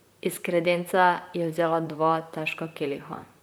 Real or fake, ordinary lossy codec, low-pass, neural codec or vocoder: fake; none; none; vocoder, 44.1 kHz, 128 mel bands, Pupu-Vocoder